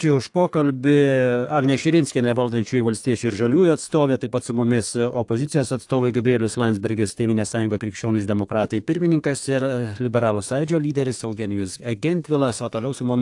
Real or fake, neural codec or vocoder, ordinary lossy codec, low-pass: fake; codec, 32 kHz, 1.9 kbps, SNAC; AAC, 64 kbps; 10.8 kHz